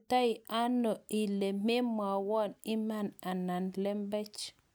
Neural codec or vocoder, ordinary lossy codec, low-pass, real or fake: none; none; none; real